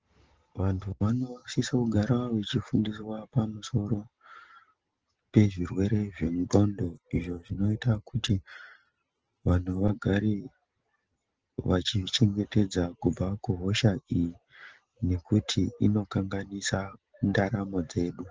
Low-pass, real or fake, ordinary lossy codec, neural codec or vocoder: 7.2 kHz; real; Opus, 16 kbps; none